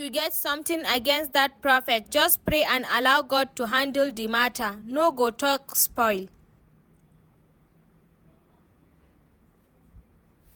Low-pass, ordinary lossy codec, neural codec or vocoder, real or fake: none; none; vocoder, 48 kHz, 128 mel bands, Vocos; fake